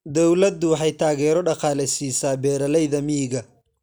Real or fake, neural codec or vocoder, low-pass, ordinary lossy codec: real; none; none; none